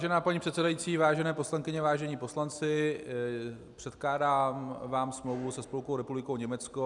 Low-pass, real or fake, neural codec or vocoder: 10.8 kHz; real; none